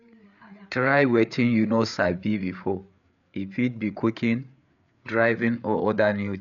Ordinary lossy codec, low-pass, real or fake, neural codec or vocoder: none; 7.2 kHz; fake; codec, 16 kHz, 8 kbps, FreqCodec, larger model